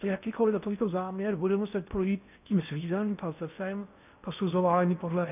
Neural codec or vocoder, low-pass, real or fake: codec, 16 kHz in and 24 kHz out, 0.6 kbps, FocalCodec, streaming, 4096 codes; 3.6 kHz; fake